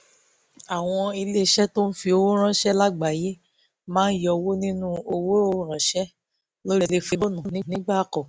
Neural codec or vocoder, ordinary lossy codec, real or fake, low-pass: none; none; real; none